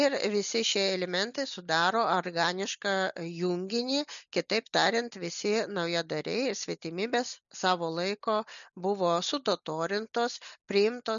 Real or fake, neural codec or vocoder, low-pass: real; none; 7.2 kHz